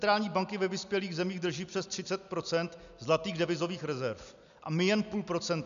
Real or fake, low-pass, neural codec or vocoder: real; 7.2 kHz; none